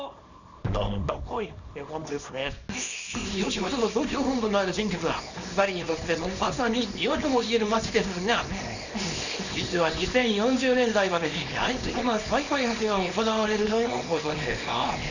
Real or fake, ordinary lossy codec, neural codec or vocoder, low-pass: fake; none; codec, 24 kHz, 0.9 kbps, WavTokenizer, small release; 7.2 kHz